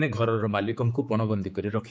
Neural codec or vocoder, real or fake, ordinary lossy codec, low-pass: codec, 16 kHz, 4 kbps, X-Codec, HuBERT features, trained on general audio; fake; none; none